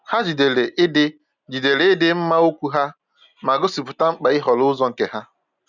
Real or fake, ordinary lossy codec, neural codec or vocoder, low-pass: real; none; none; 7.2 kHz